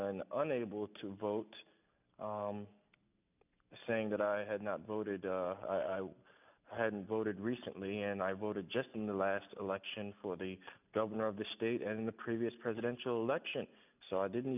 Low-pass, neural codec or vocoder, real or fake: 3.6 kHz; none; real